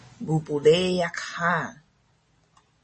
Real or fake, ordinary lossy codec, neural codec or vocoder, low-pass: real; MP3, 32 kbps; none; 10.8 kHz